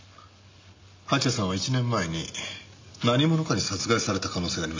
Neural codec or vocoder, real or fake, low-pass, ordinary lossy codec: none; real; 7.2 kHz; MP3, 48 kbps